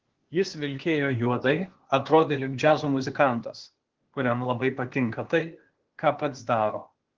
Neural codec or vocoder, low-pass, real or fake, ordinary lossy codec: codec, 16 kHz, 0.8 kbps, ZipCodec; 7.2 kHz; fake; Opus, 16 kbps